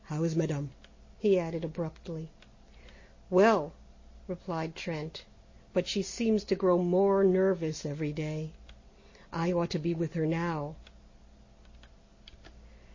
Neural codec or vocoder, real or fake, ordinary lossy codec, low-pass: none; real; MP3, 32 kbps; 7.2 kHz